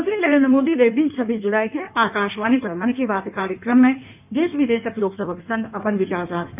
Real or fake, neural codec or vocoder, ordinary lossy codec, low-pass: fake; codec, 16 kHz in and 24 kHz out, 1.1 kbps, FireRedTTS-2 codec; none; 3.6 kHz